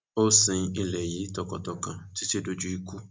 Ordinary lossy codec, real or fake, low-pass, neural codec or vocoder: none; real; none; none